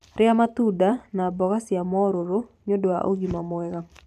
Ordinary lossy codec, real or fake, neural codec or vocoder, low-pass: none; fake; vocoder, 44.1 kHz, 128 mel bands every 512 samples, BigVGAN v2; 14.4 kHz